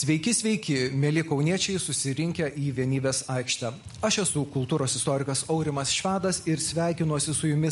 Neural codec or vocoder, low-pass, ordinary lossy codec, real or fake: none; 14.4 kHz; MP3, 48 kbps; real